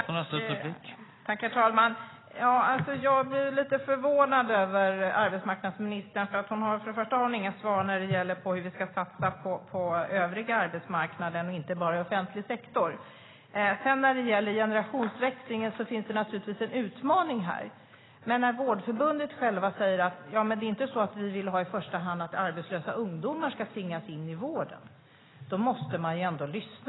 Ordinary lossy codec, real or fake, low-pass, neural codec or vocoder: AAC, 16 kbps; real; 7.2 kHz; none